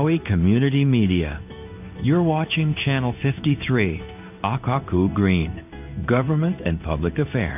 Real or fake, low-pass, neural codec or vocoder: fake; 3.6 kHz; codec, 16 kHz in and 24 kHz out, 1 kbps, XY-Tokenizer